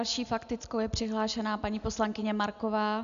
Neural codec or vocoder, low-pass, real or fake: none; 7.2 kHz; real